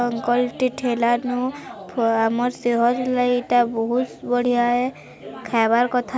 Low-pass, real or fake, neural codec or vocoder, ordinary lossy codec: none; real; none; none